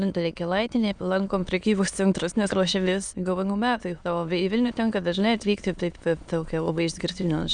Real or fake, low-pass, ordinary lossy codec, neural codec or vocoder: fake; 9.9 kHz; Opus, 64 kbps; autoencoder, 22.05 kHz, a latent of 192 numbers a frame, VITS, trained on many speakers